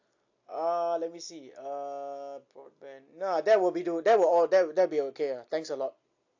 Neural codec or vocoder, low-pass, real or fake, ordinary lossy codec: none; 7.2 kHz; real; AAC, 48 kbps